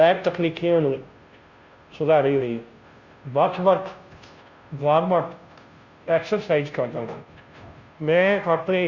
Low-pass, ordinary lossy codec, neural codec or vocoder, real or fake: 7.2 kHz; Opus, 64 kbps; codec, 16 kHz, 0.5 kbps, FunCodec, trained on Chinese and English, 25 frames a second; fake